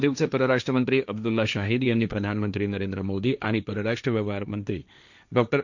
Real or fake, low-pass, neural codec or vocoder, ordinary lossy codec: fake; 7.2 kHz; codec, 16 kHz, 1.1 kbps, Voila-Tokenizer; none